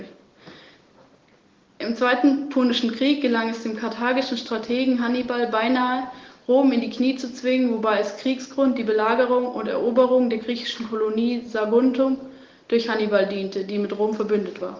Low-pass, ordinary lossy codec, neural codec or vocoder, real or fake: 7.2 kHz; Opus, 16 kbps; none; real